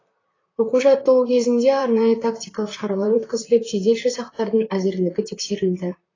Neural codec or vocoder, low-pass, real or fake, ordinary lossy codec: vocoder, 44.1 kHz, 128 mel bands, Pupu-Vocoder; 7.2 kHz; fake; AAC, 32 kbps